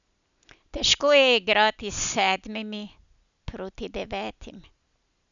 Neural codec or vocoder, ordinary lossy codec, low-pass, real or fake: none; none; 7.2 kHz; real